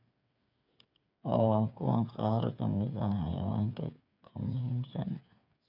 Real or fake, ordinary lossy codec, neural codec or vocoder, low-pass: fake; none; codec, 16 kHz, 8 kbps, FreqCodec, smaller model; 5.4 kHz